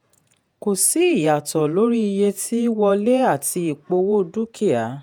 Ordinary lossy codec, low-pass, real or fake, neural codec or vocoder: none; none; fake; vocoder, 48 kHz, 128 mel bands, Vocos